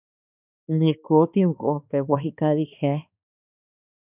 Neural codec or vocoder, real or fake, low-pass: codec, 16 kHz, 2 kbps, X-Codec, HuBERT features, trained on balanced general audio; fake; 3.6 kHz